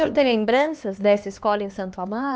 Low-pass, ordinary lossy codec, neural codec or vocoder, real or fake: none; none; codec, 16 kHz, 2 kbps, X-Codec, HuBERT features, trained on LibriSpeech; fake